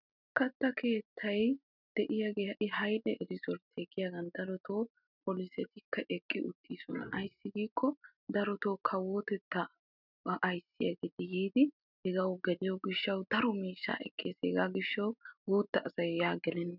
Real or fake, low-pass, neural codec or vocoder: real; 5.4 kHz; none